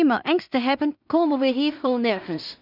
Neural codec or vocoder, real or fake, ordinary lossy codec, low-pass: codec, 16 kHz in and 24 kHz out, 0.4 kbps, LongCat-Audio-Codec, two codebook decoder; fake; none; 5.4 kHz